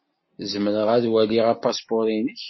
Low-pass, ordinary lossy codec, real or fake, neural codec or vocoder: 7.2 kHz; MP3, 24 kbps; real; none